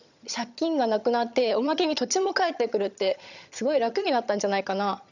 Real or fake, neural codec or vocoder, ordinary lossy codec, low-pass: fake; vocoder, 22.05 kHz, 80 mel bands, HiFi-GAN; none; 7.2 kHz